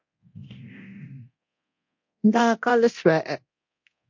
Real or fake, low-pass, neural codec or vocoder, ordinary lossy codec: fake; 7.2 kHz; codec, 24 kHz, 0.9 kbps, DualCodec; MP3, 48 kbps